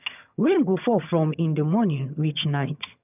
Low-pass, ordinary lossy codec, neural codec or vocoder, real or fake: 3.6 kHz; none; vocoder, 22.05 kHz, 80 mel bands, HiFi-GAN; fake